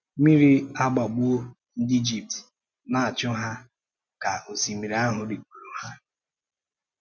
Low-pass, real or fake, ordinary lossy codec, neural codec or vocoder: none; real; none; none